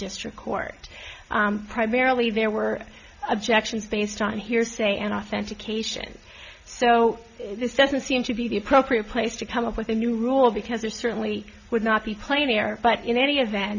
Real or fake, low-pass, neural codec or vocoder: real; 7.2 kHz; none